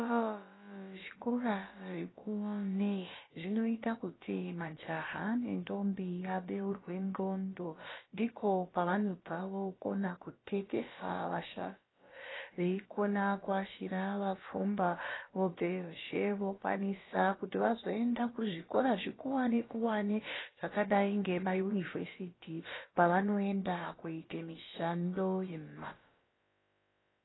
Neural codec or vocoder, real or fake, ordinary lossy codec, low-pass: codec, 16 kHz, about 1 kbps, DyCAST, with the encoder's durations; fake; AAC, 16 kbps; 7.2 kHz